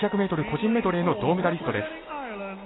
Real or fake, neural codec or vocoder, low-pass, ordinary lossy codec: real; none; 7.2 kHz; AAC, 16 kbps